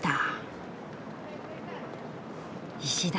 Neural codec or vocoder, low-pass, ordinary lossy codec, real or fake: none; none; none; real